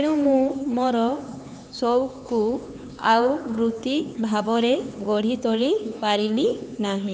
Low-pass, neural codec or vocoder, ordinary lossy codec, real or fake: none; codec, 16 kHz, 4 kbps, X-Codec, HuBERT features, trained on LibriSpeech; none; fake